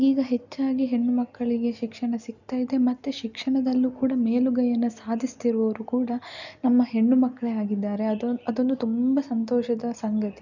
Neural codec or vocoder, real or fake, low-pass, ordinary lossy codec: none; real; 7.2 kHz; none